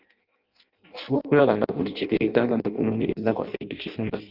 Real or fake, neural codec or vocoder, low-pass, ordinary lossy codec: fake; codec, 16 kHz in and 24 kHz out, 0.6 kbps, FireRedTTS-2 codec; 5.4 kHz; Opus, 24 kbps